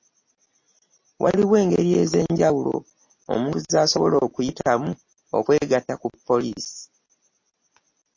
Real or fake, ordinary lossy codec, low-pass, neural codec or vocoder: real; MP3, 32 kbps; 7.2 kHz; none